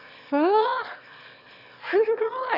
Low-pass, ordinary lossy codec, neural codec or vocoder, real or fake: 5.4 kHz; none; autoencoder, 22.05 kHz, a latent of 192 numbers a frame, VITS, trained on one speaker; fake